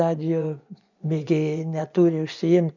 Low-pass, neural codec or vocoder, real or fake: 7.2 kHz; vocoder, 24 kHz, 100 mel bands, Vocos; fake